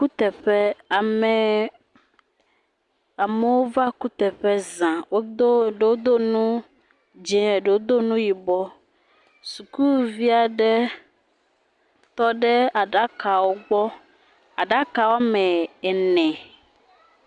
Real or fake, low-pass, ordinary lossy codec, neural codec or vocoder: real; 10.8 kHz; Opus, 64 kbps; none